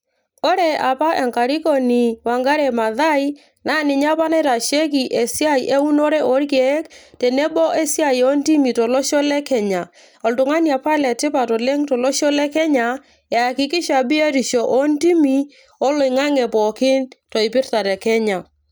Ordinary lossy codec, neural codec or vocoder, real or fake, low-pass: none; none; real; none